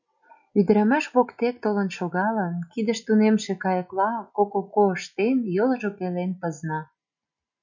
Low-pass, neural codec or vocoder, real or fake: 7.2 kHz; none; real